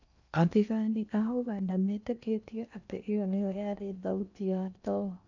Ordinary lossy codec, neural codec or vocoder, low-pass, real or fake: none; codec, 16 kHz in and 24 kHz out, 0.8 kbps, FocalCodec, streaming, 65536 codes; 7.2 kHz; fake